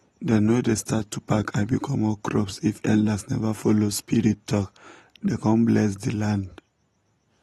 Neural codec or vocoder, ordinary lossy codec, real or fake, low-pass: none; AAC, 32 kbps; real; 19.8 kHz